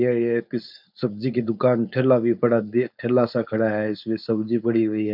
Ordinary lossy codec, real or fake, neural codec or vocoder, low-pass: none; fake; codec, 16 kHz, 4.8 kbps, FACodec; 5.4 kHz